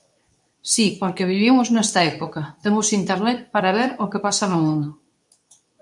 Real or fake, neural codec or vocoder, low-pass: fake; codec, 24 kHz, 0.9 kbps, WavTokenizer, medium speech release version 2; 10.8 kHz